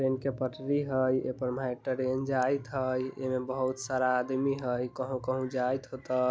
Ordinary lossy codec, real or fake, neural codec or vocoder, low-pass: none; real; none; none